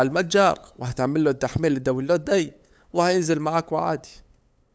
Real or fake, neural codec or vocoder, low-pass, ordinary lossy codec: fake; codec, 16 kHz, 8 kbps, FunCodec, trained on LibriTTS, 25 frames a second; none; none